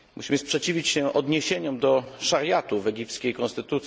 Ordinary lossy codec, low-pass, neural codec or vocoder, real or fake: none; none; none; real